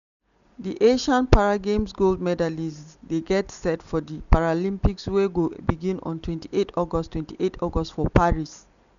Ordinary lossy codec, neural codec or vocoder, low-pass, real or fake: none; none; 7.2 kHz; real